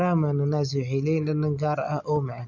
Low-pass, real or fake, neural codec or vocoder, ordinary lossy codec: 7.2 kHz; real; none; none